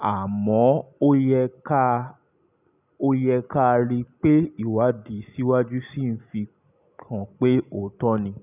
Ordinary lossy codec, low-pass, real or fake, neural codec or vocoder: none; 3.6 kHz; real; none